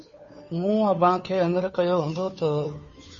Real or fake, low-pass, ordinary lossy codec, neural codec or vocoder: fake; 7.2 kHz; MP3, 32 kbps; codec, 16 kHz, 2 kbps, FunCodec, trained on Chinese and English, 25 frames a second